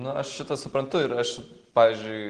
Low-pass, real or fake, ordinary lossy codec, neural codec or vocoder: 9.9 kHz; real; Opus, 16 kbps; none